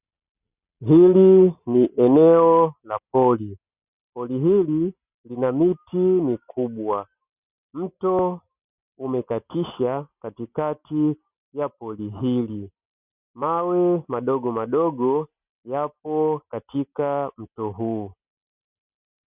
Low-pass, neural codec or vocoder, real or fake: 3.6 kHz; none; real